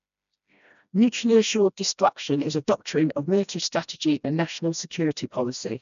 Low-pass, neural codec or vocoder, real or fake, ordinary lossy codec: 7.2 kHz; codec, 16 kHz, 1 kbps, FreqCodec, smaller model; fake; none